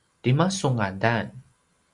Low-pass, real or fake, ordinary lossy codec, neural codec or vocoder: 10.8 kHz; real; Opus, 64 kbps; none